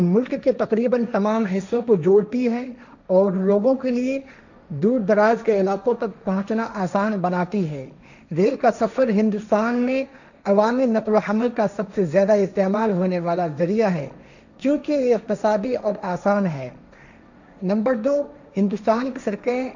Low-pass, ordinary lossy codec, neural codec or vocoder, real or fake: 7.2 kHz; none; codec, 16 kHz, 1.1 kbps, Voila-Tokenizer; fake